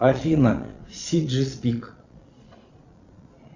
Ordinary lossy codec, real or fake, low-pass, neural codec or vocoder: Opus, 64 kbps; fake; 7.2 kHz; vocoder, 22.05 kHz, 80 mel bands, Vocos